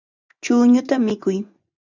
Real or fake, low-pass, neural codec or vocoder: fake; 7.2 kHz; vocoder, 24 kHz, 100 mel bands, Vocos